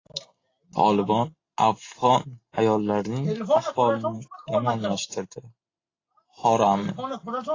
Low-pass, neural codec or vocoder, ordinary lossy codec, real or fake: 7.2 kHz; none; AAC, 32 kbps; real